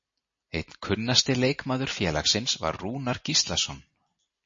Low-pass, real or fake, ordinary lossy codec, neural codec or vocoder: 7.2 kHz; real; MP3, 32 kbps; none